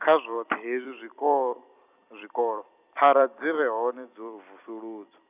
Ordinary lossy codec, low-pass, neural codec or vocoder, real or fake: none; 3.6 kHz; vocoder, 44.1 kHz, 128 mel bands every 256 samples, BigVGAN v2; fake